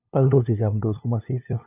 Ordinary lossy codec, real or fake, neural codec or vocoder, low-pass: none; fake; codec, 16 kHz, 2 kbps, FunCodec, trained on LibriTTS, 25 frames a second; 3.6 kHz